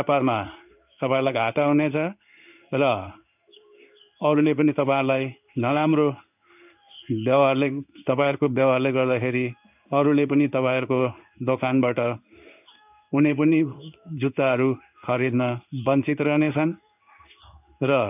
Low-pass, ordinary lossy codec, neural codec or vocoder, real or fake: 3.6 kHz; none; codec, 16 kHz in and 24 kHz out, 1 kbps, XY-Tokenizer; fake